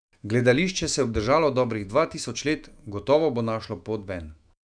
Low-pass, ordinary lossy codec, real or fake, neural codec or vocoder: 9.9 kHz; none; fake; autoencoder, 48 kHz, 128 numbers a frame, DAC-VAE, trained on Japanese speech